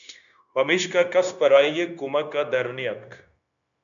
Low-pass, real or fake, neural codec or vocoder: 7.2 kHz; fake; codec, 16 kHz, 0.9 kbps, LongCat-Audio-Codec